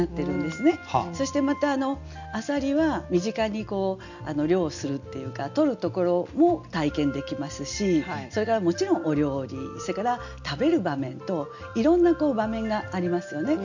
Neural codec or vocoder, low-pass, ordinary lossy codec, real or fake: none; 7.2 kHz; none; real